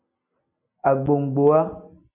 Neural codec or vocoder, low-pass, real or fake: none; 3.6 kHz; real